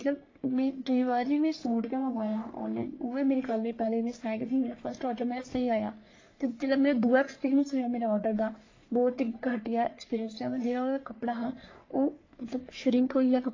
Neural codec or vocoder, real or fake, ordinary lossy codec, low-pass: codec, 44.1 kHz, 3.4 kbps, Pupu-Codec; fake; AAC, 32 kbps; 7.2 kHz